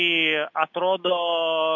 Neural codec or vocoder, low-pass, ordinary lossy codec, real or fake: none; 7.2 kHz; MP3, 48 kbps; real